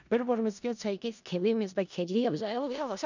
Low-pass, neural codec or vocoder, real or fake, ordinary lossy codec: 7.2 kHz; codec, 16 kHz in and 24 kHz out, 0.4 kbps, LongCat-Audio-Codec, four codebook decoder; fake; none